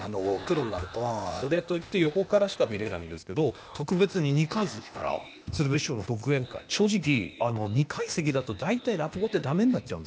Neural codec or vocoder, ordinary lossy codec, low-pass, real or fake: codec, 16 kHz, 0.8 kbps, ZipCodec; none; none; fake